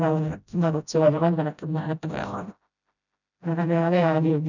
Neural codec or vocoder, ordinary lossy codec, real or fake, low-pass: codec, 16 kHz, 0.5 kbps, FreqCodec, smaller model; none; fake; 7.2 kHz